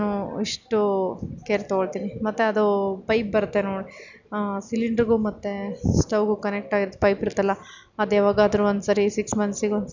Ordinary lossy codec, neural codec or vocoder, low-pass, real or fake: none; none; 7.2 kHz; real